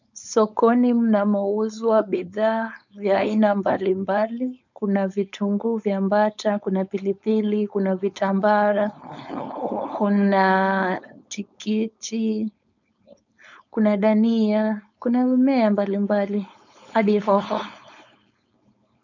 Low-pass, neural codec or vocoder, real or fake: 7.2 kHz; codec, 16 kHz, 4.8 kbps, FACodec; fake